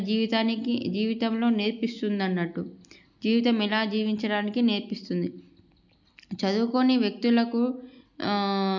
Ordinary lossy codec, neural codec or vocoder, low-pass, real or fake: none; none; 7.2 kHz; real